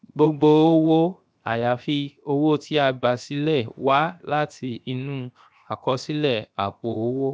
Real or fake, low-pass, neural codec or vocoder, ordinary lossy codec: fake; none; codec, 16 kHz, 0.7 kbps, FocalCodec; none